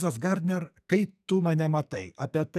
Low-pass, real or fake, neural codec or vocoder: 14.4 kHz; fake; codec, 44.1 kHz, 2.6 kbps, SNAC